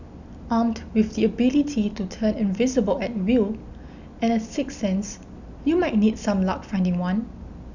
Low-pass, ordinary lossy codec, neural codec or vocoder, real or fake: 7.2 kHz; none; none; real